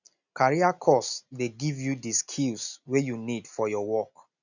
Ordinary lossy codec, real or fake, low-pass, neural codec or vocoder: none; real; 7.2 kHz; none